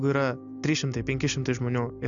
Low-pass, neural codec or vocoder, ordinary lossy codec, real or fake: 7.2 kHz; none; MP3, 96 kbps; real